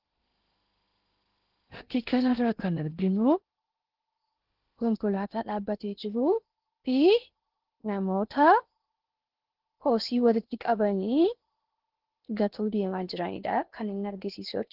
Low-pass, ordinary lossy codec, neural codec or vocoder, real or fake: 5.4 kHz; Opus, 16 kbps; codec, 16 kHz in and 24 kHz out, 0.8 kbps, FocalCodec, streaming, 65536 codes; fake